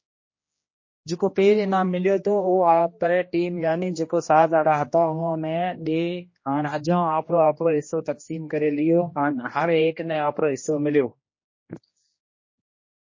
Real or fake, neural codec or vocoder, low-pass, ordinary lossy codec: fake; codec, 16 kHz, 1 kbps, X-Codec, HuBERT features, trained on general audio; 7.2 kHz; MP3, 32 kbps